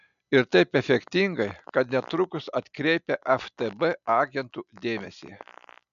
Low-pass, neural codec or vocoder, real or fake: 7.2 kHz; none; real